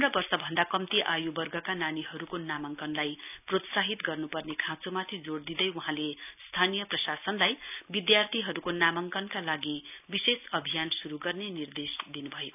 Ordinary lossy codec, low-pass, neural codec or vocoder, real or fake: none; 3.6 kHz; none; real